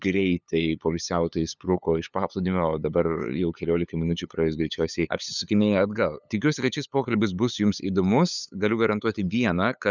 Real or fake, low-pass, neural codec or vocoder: fake; 7.2 kHz; codec, 16 kHz, 8 kbps, FunCodec, trained on LibriTTS, 25 frames a second